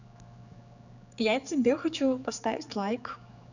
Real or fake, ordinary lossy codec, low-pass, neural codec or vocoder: fake; none; 7.2 kHz; codec, 16 kHz, 4 kbps, X-Codec, HuBERT features, trained on general audio